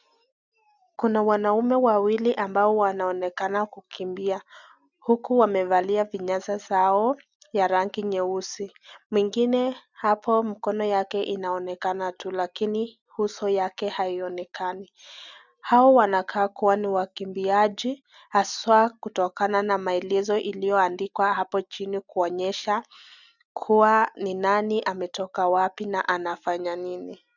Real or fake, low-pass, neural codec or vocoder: real; 7.2 kHz; none